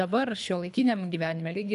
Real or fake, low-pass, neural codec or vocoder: fake; 10.8 kHz; codec, 24 kHz, 3 kbps, HILCodec